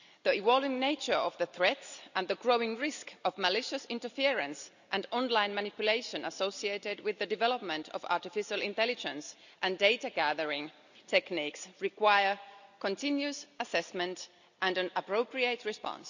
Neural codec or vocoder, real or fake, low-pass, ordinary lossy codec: none; real; 7.2 kHz; none